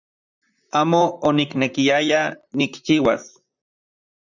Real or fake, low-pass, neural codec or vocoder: fake; 7.2 kHz; vocoder, 44.1 kHz, 128 mel bands, Pupu-Vocoder